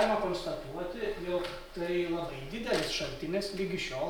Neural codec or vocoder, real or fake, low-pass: none; real; 19.8 kHz